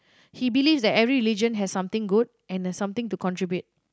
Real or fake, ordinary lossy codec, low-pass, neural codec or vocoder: real; none; none; none